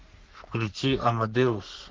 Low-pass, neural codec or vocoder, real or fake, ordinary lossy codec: 7.2 kHz; codec, 44.1 kHz, 3.4 kbps, Pupu-Codec; fake; Opus, 16 kbps